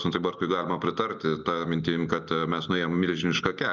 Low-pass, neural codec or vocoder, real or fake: 7.2 kHz; none; real